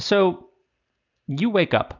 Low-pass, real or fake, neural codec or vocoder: 7.2 kHz; real; none